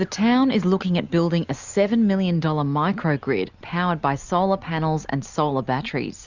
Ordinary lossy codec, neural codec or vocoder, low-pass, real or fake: Opus, 64 kbps; none; 7.2 kHz; real